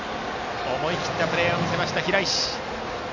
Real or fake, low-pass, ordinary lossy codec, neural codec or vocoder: real; 7.2 kHz; none; none